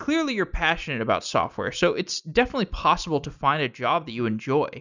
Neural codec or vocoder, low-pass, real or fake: none; 7.2 kHz; real